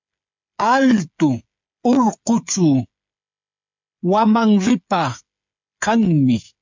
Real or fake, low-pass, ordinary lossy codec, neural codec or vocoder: fake; 7.2 kHz; MP3, 64 kbps; codec, 16 kHz, 8 kbps, FreqCodec, smaller model